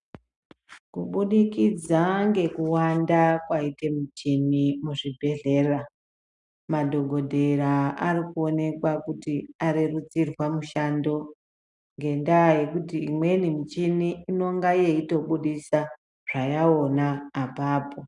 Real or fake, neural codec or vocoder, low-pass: real; none; 10.8 kHz